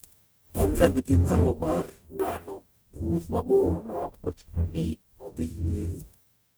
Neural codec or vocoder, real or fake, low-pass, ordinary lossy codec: codec, 44.1 kHz, 0.9 kbps, DAC; fake; none; none